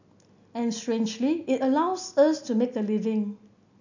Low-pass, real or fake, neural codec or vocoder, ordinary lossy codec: 7.2 kHz; real; none; none